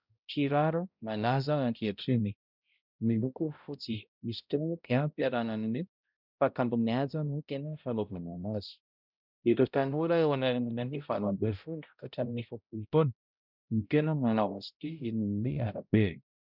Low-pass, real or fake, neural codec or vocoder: 5.4 kHz; fake; codec, 16 kHz, 0.5 kbps, X-Codec, HuBERT features, trained on balanced general audio